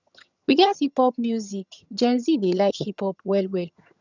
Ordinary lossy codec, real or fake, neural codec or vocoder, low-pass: none; fake; vocoder, 22.05 kHz, 80 mel bands, HiFi-GAN; 7.2 kHz